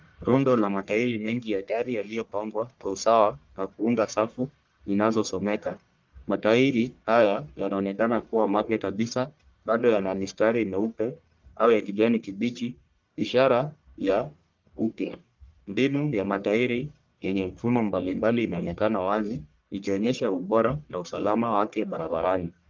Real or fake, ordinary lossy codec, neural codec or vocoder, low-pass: fake; Opus, 24 kbps; codec, 44.1 kHz, 1.7 kbps, Pupu-Codec; 7.2 kHz